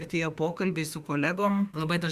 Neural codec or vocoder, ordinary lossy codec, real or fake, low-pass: autoencoder, 48 kHz, 32 numbers a frame, DAC-VAE, trained on Japanese speech; Opus, 64 kbps; fake; 14.4 kHz